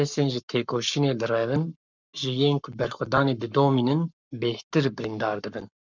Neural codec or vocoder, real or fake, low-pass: codec, 44.1 kHz, 7.8 kbps, Pupu-Codec; fake; 7.2 kHz